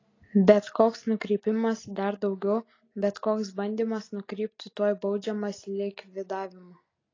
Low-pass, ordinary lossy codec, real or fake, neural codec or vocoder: 7.2 kHz; AAC, 32 kbps; real; none